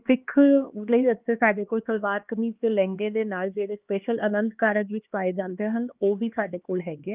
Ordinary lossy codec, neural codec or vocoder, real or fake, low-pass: Opus, 24 kbps; codec, 16 kHz, 2 kbps, X-Codec, HuBERT features, trained on LibriSpeech; fake; 3.6 kHz